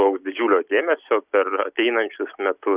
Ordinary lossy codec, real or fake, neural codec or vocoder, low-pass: Opus, 64 kbps; real; none; 3.6 kHz